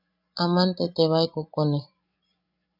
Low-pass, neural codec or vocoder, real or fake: 5.4 kHz; codec, 16 kHz, 16 kbps, FreqCodec, larger model; fake